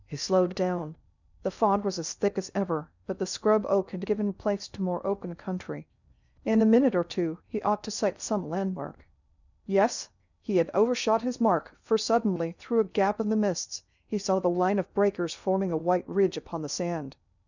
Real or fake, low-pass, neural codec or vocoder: fake; 7.2 kHz; codec, 16 kHz in and 24 kHz out, 0.6 kbps, FocalCodec, streaming, 2048 codes